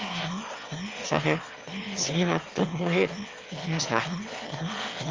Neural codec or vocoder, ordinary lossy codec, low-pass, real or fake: autoencoder, 22.05 kHz, a latent of 192 numbers a frame, VITS, trained on one speaker; Opus, 32 kbps; 7.2 kHz; fake